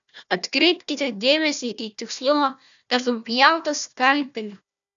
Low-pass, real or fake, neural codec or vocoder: 7.2 kHz; fake; codec, 16 kHz, 1 kbps, FunCodec, trained on Chinese and English, 50 frames a second